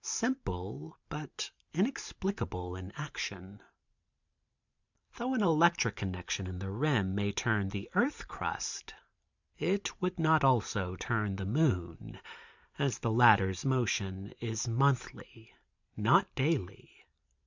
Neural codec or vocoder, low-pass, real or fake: vocoder, 44.1 kHz, 128 mel bands every 512 samples, BigVGAN v2; 7.2 kHz; fake